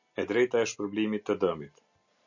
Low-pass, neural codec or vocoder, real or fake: 7.2 kHz; none; real